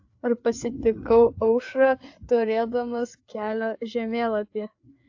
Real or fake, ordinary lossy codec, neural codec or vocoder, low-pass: fake; AAC, 48 kbps; codec, 16 kHz, 4 kbps, FreqCodec, larger model; 7.2 kHz